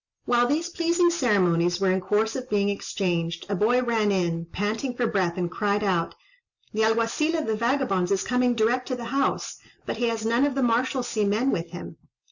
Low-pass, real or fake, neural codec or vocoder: 7.2 kHz; real; none